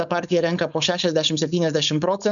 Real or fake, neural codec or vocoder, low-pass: fake; codec, 16 kHz, 4.8 kbps, FACodec; 7.2 kHz